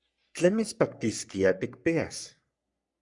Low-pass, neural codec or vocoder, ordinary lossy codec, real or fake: 10.8 kHz; codec, 44.1 kHz, 3.4 kbps, Pupu-Codec; MP3, 96 kbps; fake